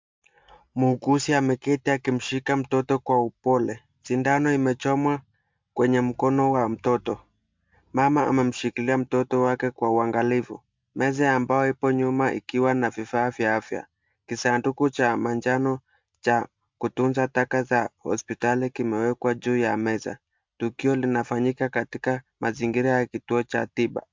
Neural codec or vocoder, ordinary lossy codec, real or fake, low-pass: none; MP3, 64 kbps; real; 7.2 kHz